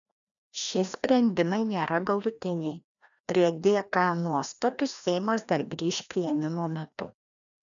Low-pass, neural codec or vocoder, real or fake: 7.2 kHz; codec, 16 kHz, 1 kbps, FreqCodec, larger model; fake